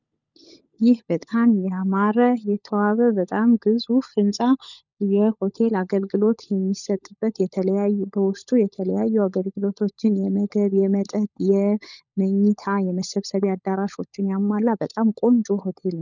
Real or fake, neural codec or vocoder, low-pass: fake; codec, 16 kHz, 16 kbps, FunCodec, trained on LibriTTS, 50 frames a second; 7.2 kHz